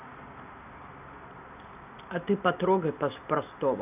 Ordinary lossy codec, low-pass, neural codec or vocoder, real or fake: none; 3.6 kHz; none; real